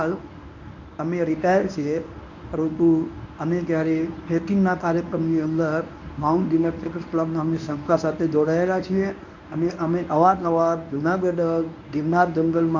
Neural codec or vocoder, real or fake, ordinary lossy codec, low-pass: codec, 24 kHz, 0.9 kbps, WavTokenizer, medium speech release version 1; fake; none; 7.2 kHz